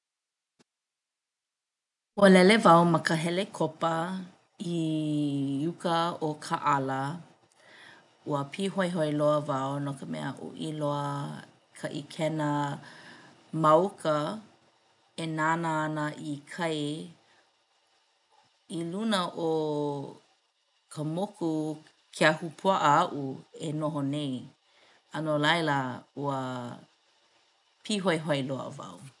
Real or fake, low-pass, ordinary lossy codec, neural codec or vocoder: real; 10.8 kHz; none; none